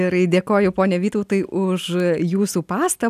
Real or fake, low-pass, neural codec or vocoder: real; 14.4 kHz; none